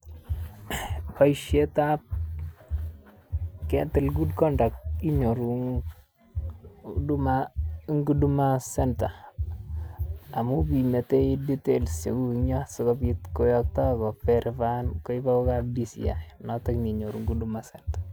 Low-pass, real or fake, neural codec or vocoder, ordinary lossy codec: none; real; none; none